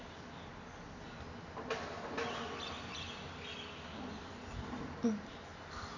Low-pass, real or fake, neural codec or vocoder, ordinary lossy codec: 7.2 kHz; real; none; none